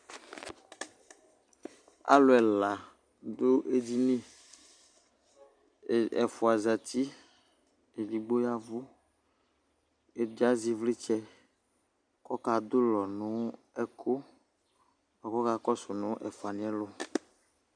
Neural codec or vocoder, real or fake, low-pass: none; real; 9.9 kHz